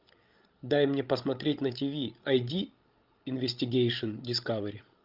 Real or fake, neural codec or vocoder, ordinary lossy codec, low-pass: fake; codec, 16 kHz, 16 kbps, FreqCodec, larger model; Opus, 32 kbps; 5.4 kHz